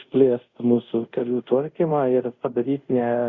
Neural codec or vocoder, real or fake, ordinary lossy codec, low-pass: codec, 24 kHz, 0.5 kbps, DualCodec; fake; Opus, 64 kbps; 7.2 kHz